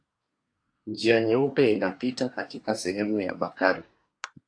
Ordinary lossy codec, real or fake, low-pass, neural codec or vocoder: AAC, 48 kbps; fake; 9.9 kHz; codec, 24 kHz, 1 kbps, SNAC